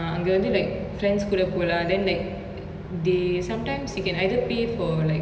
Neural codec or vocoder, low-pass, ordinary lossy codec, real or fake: none; none; none; real